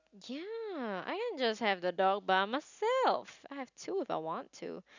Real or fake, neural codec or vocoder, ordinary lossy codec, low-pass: real; none; none; 7.2 kHz